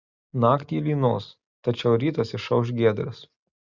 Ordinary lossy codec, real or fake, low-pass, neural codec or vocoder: Opus, 64 kbps; real; 7.2 kHz; none